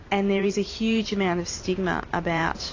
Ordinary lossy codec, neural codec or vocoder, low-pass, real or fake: AAC, 32 kbps; vocoder, 44.1 kHz, 128 mel bands every 512 samples, BigVGAN v2; 7.2 kHz; fake